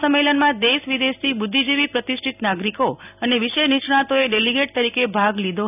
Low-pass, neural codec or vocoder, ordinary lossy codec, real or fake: 3.6 kHz; none; none; real